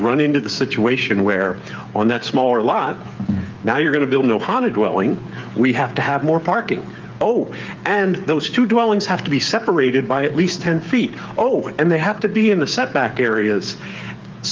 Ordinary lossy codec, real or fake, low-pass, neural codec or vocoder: Opus, 24 kbps; fake; 7.2 kHz; codec, 16 kHz, 8 kbps, FreqCodec, smaller model